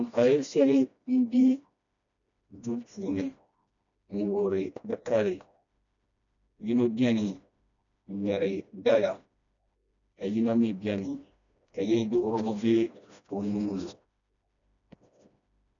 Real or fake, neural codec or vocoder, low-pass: fake; codec, 16 kHz, 1 kbps, FreqCodec, smaller model; 7.2 kHz